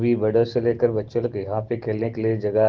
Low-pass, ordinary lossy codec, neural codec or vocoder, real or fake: 7.2 kHz; Opus, 16 kbps; none; real